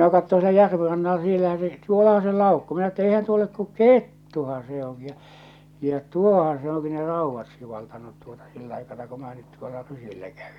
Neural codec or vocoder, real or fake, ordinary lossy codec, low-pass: none; real; none; 19.8 kHz